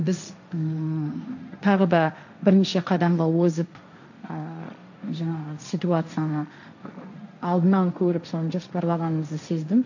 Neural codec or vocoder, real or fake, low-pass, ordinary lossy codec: codec, 16 kHz, 1.1 kbps, Voila-Tokenizer; fake; 7.2 kHz; none